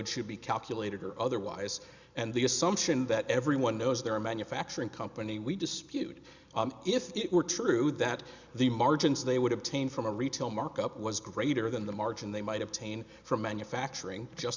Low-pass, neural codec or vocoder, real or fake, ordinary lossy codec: 7.2 kHz; none; real; Opus, 64 kbps